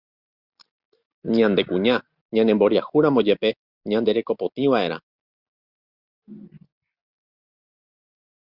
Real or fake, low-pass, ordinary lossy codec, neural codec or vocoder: real; 5.4 kHz; Opus, 64 kbps; none